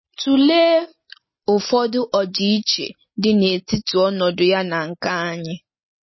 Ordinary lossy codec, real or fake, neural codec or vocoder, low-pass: MP3, 24 kbps; real; none; 7.2 kHz